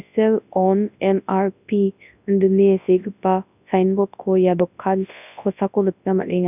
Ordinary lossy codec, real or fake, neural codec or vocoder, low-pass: none; fake; codec, 24 kHz, 0.9 kbps, WavTokenizer, large speech release; 3.6 kHz